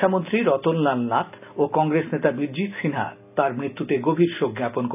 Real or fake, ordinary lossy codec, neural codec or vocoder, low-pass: real; none; none; 3.6 kHz